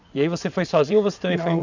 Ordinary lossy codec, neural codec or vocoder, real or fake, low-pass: none; vocoder, 22.05 kHz, 80 mel bands, WaveNeXt; fake; 7.2 kHz